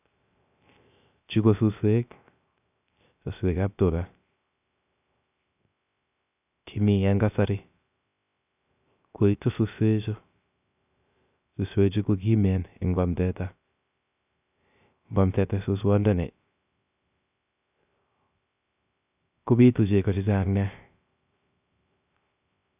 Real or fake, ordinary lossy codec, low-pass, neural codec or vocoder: fake; none; 3.6 kHz; codec, 16 kHz, 0.3 kbps, FocalCodec